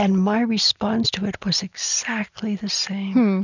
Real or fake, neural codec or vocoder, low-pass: real; none; 7.2 kHz